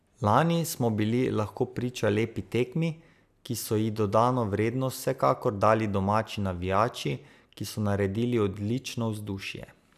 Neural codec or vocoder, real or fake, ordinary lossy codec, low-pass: none; real; AAC, 96 kbps; 14.4 kHz